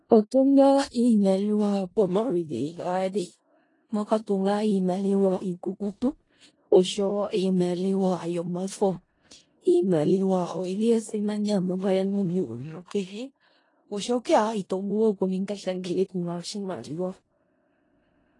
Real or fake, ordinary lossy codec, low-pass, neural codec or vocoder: fake; AAC, 32 kbps; 10.8 kHz; codec, 16 kHz in and 24 kHz out, 0.4 kbps, LongCat-Audio-Codec, four codebook decoder